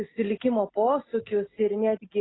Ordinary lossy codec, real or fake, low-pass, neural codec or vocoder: AAC, 16 kbps; real; 7.2 kHz; none